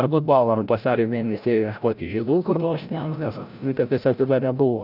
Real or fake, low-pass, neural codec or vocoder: fake; 5.4 kHz; codec, 16 kHz, 0.5 kbps, FreqCodec, larger model